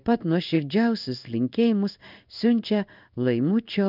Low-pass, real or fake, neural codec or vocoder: 5.4 kHz; fake; codec, 16 kHz in and 24 kHz out, 1 kbps, XY-Tokenizer